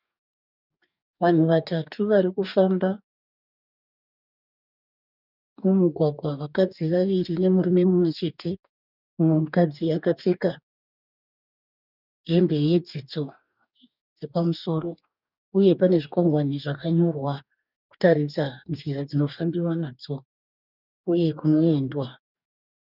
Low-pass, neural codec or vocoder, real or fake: 5.4 kHz; codec, 44.1 kHz, 2.6 kbps, DAC; fake